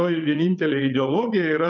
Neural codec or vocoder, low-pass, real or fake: vocoder, 22.05 kHz, 80 mel bands, WaveNeXt; 7.2 kHz; fake